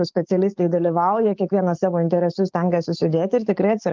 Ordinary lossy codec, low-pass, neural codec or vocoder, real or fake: Opus, 24 kbps; 7.2 kHz; codec, 44.1 kHz, 7.8 kbps, DAC; fake